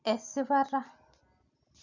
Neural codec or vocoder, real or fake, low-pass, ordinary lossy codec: none; real; 7.2 kHz; none